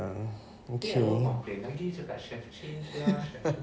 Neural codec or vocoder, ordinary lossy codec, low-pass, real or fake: none; none; none; real